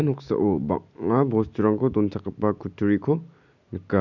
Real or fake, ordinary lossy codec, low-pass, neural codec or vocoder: real; none; 7.2 kHz; none